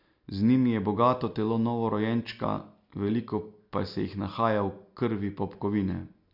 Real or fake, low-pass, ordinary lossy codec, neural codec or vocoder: real; 5.4 kHz; MP3, 48 kbps; none